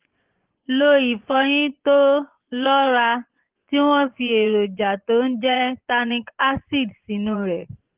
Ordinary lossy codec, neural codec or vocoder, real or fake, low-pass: Opus, 16 kbps; vocoder, 24 kHz, 100 mel bands, Vocos; fake; 3.6 kHz